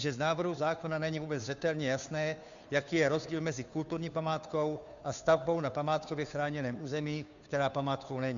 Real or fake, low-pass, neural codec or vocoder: fake; 7.2 kHz; codec, 16 kHz, 2 kbps, FunCodec, trained on Chinese and English, 25 frames a second